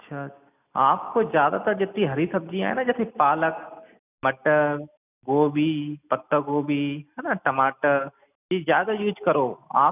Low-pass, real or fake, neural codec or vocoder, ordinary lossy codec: 3.6 kHz; real; none; none